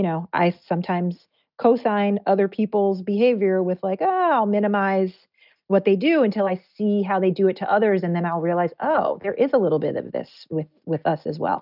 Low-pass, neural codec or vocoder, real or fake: 5.4 kHz; none; real